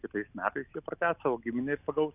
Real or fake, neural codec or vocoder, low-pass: real; none; 3.6 kHz